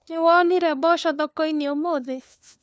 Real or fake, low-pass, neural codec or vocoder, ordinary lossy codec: fake; none; codec, 16 kHz, 4 kbps, FunCodec, trained on LibriTTS, 50 frames a second; none